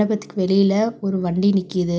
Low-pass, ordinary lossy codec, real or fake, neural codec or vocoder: none; none; real; none